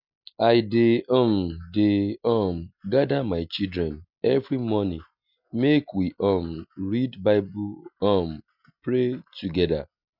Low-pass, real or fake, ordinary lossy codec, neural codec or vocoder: 5.4 kHz; real; none; none